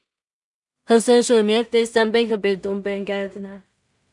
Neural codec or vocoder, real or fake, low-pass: codec, 16 kHz in and 24 kHz out, 0.4 kbps, LongCat-Audio-Codec, two codebook decoder; fake; 10.8 kHz